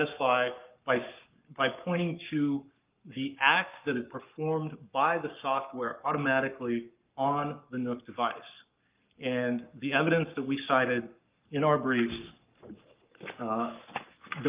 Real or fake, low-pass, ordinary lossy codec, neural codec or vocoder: fake; 3.6 kHz; Opus, 24 kbps; codec, 44.1 kHz, 7.8 kbps, Pupu-Codec